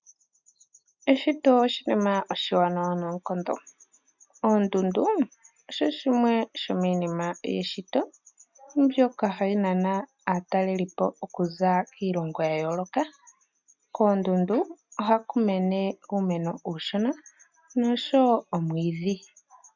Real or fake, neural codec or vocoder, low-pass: real; none; 7.2 kHz